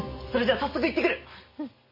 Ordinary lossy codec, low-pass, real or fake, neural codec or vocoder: MP3, 24 kbps; 5.4 kHz; real; none